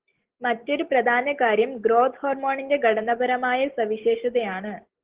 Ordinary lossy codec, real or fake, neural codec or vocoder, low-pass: Opus, 16 kbps; real; none; 3.6 kHz